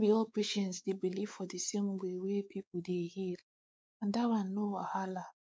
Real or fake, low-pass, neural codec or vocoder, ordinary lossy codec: fake; none; codec, 16 kHz, 4 kbps, X-Codec, WavLM features, trained on Multilingual LibriSpeech; none